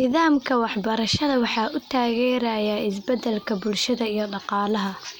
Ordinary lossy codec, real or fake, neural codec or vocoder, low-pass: none; real; none; none